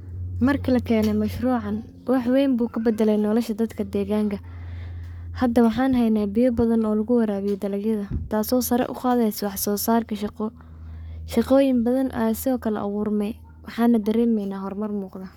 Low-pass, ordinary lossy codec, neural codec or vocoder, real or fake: 19.8 kHz; none; codec, 44.1 kHz, 7.8 kbps, Pupu-Codec; fake